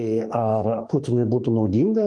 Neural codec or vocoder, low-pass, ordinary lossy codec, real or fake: autoencoder, 48 kHz, 32 numbers a frame, DAC-VAE, trained on Japanese speech; 10.8 kHz; Opus, 32 kbps; fake